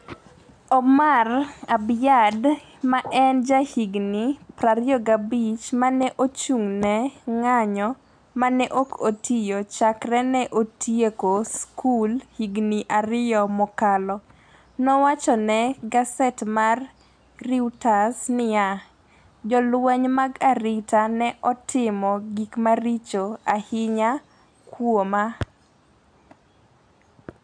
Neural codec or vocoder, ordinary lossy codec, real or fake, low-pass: none; none; real; 9.9 kHz